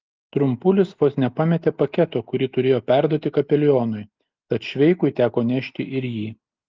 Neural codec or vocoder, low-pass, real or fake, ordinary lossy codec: none; 7.2 kHz; real; Opus, 32 kbps